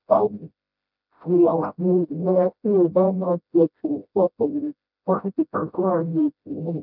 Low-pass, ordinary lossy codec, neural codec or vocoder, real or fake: 5.4 kHz; none; codec, 16 kHz, 0.5 kbps, FreqCodec, smaller model; fake